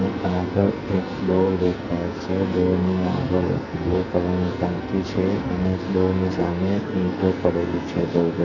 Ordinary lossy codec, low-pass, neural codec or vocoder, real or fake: none; 7.2 kHz; codec, 44.1 kHz, 2.6 kbps, SNAC; fake